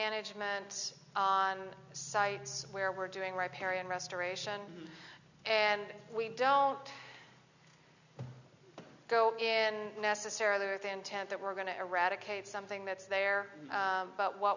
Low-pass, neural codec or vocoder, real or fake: 7.2 kHz; none; real